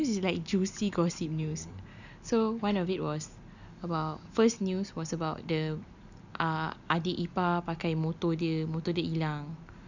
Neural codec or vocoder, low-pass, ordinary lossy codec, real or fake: none; 7.2 kHz; none; real